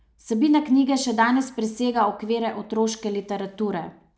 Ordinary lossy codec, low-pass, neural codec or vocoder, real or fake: none; none; none; real